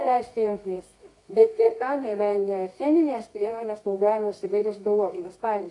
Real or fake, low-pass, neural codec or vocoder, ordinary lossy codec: fake; 10.8 kHz; codec, 24 kHz, 0.9 kbps, WavTokenizer, medium music audio release; AAC, 48 kbps